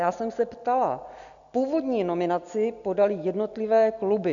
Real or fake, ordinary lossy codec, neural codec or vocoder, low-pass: real; MP3, 96 kbps; none; 7.2 kHz